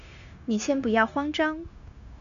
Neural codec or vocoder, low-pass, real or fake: codec, 16 kHz, 0.9 kbps, LongCat-Audio-Codec; 7.2 kHz; fake